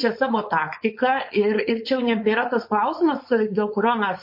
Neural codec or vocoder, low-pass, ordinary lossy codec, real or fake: codec, 16 kHz, 4.8 kbps, FACodec; 5.4 kHz; MP3, 32 kbps; fake